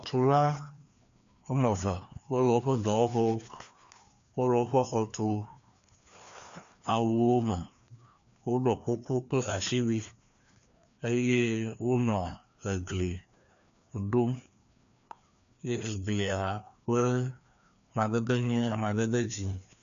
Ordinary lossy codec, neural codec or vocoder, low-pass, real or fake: MP3, 64 kbps; codec, 16 kHz, 2 kbps, FreqCodec, larger model; 7.2 kHz; fake